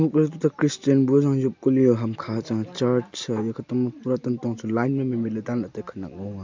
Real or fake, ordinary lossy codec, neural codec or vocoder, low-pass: real; MP3, 64 kbps; none; 7.2 kHz